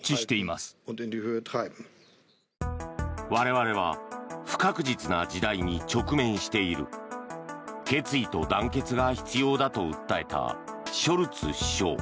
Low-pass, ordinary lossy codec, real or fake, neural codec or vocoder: none; none; real; none